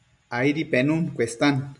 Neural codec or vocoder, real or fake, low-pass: none; real; 10.8 kHz